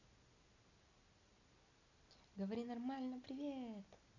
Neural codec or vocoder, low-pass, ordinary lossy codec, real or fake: none; 7.2 kHz; none; real